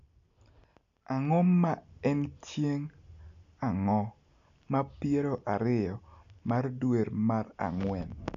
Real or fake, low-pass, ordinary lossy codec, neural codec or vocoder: real; 7.2 kHz; none; none